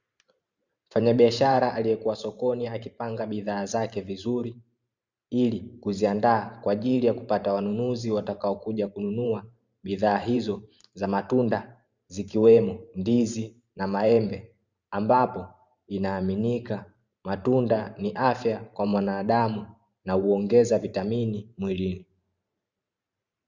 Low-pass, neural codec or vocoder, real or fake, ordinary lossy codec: 7.2 kHz; vocoder, 44.1 kHz, 128 mel bands every 512 samples, BigVGAN v2; fake; Opus, 64 kbps